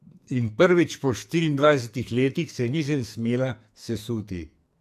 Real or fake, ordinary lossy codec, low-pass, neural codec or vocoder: fake; AAC, 96 kbps; 14.4 kHz; codec, 44.1 kHz, 2.6 kbps, SNAC